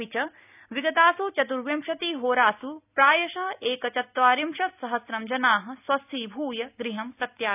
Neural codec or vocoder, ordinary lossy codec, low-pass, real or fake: none; none; 3.6 kHz; real